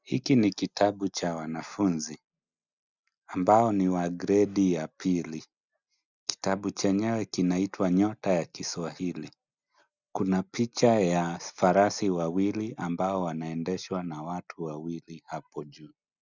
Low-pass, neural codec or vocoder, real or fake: 7.2 kHz; none; real